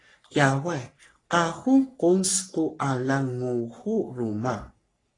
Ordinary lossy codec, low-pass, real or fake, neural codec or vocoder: AAC, 32 kbps; 10.8 kHz; fake; codec, 44.1 kHz, 3.4 kbps, Pupu-Codec